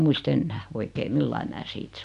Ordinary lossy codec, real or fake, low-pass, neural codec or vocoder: none; real; 10.8 kHz; none